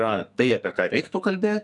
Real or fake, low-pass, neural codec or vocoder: fake; 10.8 kHz; codec, 44.1 kHz, 2.6 kbps, SNAC